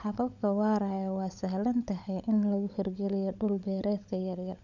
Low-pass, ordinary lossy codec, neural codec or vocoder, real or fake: 7.2 kHz; none; codec, 16 kHz, 16 kbps, FunCodec, trained on LibriTTS, 50 frames a second; fake